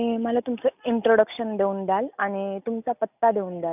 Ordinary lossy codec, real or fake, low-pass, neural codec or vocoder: none; real; 3.6 kHz; none